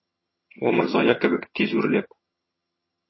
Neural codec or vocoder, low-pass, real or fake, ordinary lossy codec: vocoder, 22.05 kHz, 80 mel bands, HiFi-GAN; 7.2 kHz; fake; MP3, 24 kbps